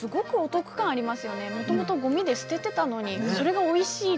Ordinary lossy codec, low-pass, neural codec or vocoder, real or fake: none; none; none; real